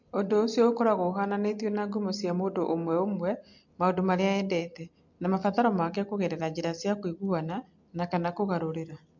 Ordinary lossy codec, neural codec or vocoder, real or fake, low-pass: MP3, 48 kbps; none; real; 7.2 kHz